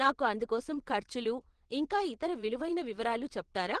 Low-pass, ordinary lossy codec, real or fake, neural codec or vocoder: 9.9 kHz; Opus, 16 kbps; fake; vocoder, 22.05 kHz, 80 mel bands, WaveNeXt